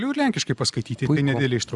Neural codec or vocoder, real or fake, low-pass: none; real; 10.8 kHz